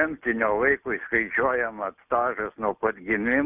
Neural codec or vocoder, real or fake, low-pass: none; real; 3.6 kHz